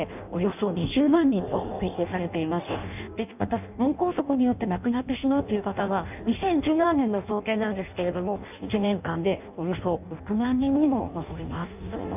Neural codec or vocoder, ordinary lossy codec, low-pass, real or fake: codec, 16 kHz in and 24 kHz out, 0.6 kbps, FireRedTTS-2 codec; none; 3.6 kHz; fake